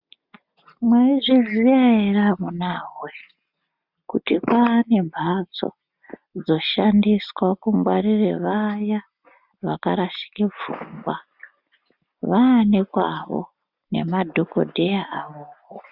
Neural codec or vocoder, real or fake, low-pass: none; real; 5.4 kHz